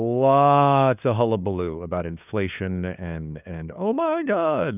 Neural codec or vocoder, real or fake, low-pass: codec, 16 kHz, 2 kbps, FunCodec, trained on LibriTTS, 25 frames a second; fake; 3.6 kHz